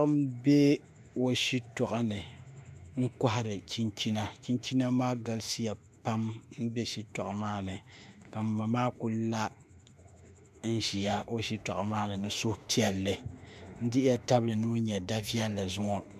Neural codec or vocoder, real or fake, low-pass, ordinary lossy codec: autoencoder, 48 kHz, 32 numbers a frame, DAC-VAE, trained on Japanese speech; fake; 14.4 kHz; MP3, 96 kbps